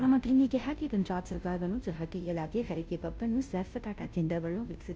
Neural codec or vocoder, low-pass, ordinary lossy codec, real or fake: codec, 16 kHz, 0.5 kbps, FunCodec, trained on Chinese and English, 25 frames a second; none; none; fake